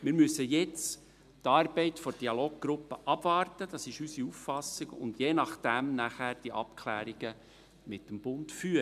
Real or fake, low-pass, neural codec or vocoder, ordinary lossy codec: real; 14.4 kHz; none; none